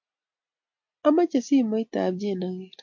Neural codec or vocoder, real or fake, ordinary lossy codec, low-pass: none; real; MP3, 48 kbps; 7.2 kHz